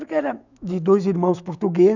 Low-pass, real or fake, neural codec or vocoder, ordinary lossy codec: 7.2 kHz; fake; autoencoder, 48 kHz, 128 numbers a frame, DAC-VAE, trained on Japanese speech; none